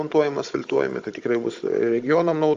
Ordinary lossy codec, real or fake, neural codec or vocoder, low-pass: Opus, 32 kbps; fake; codec, 16 kHz, 16 kbps, FunCodec, trained on Chinese and English, 50 frames a second; 7.2 kHz